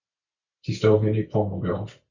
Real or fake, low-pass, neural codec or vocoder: real; 7.2 kHz; none